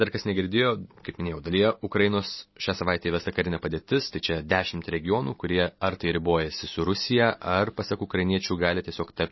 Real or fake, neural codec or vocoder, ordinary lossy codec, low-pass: real; none; MP3, 24 kbps; 7.2 kHz